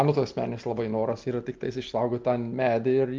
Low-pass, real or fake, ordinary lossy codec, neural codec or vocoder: 7.2 kHz; real; Opus, 32 kbps; none